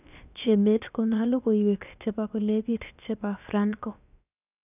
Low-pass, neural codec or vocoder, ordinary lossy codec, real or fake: 3.6 kHz; codec, 16 kHz, about 1 kbps, DyCAST, with the encoder's durations; none; fake